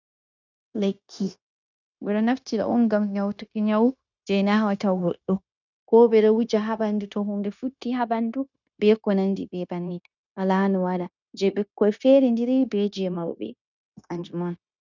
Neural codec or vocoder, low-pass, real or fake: codec, 16 kHz, 0.9 kbps, LongCat-Audio-Codec; 7.2 kHz; fake